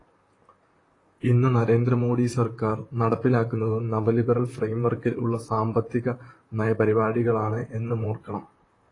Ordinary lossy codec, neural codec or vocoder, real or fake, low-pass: AAC, 32 kbps; vocoder, 44.1 kHz, 128 mel bands, Pupu-Vocoder; fake; 10.8 kHz